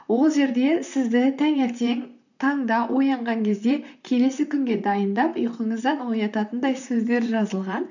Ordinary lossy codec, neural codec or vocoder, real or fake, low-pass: none; vocoder, 44.1 kHz, 128 mel bands, Pupu-Vocoder; fake; 7.2 kHz